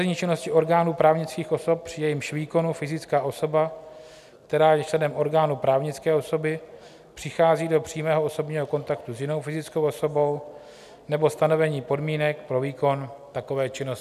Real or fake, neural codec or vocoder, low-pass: real; none; 14.4 kHz